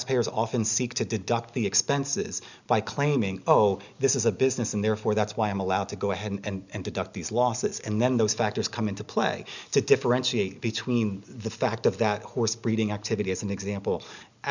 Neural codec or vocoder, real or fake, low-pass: none; real; 7.2 kHz